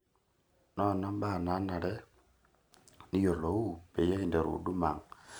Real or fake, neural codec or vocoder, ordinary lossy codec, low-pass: real; none; none; none